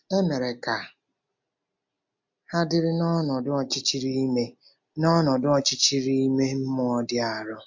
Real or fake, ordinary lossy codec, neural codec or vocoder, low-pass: real; MP3, 64 kbps; none; 7.2 kHz